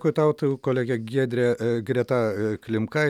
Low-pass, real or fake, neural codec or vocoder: 19.8 kHz; real; none